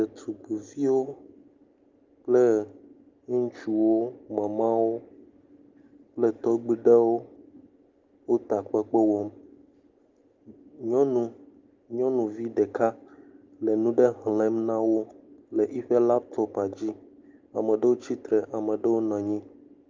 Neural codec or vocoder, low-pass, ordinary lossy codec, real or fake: none; 7.2 kHz; Opus, 24 kbps; real